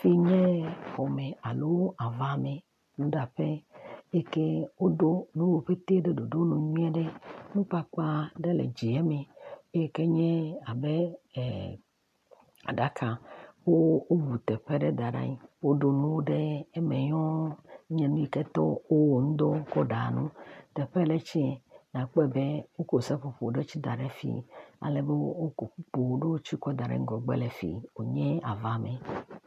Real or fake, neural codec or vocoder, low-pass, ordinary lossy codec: real; none; 14.4 kHz; MP3, 64 kbps